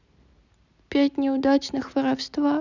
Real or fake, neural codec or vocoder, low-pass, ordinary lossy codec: real; none; 7.2 kHz; none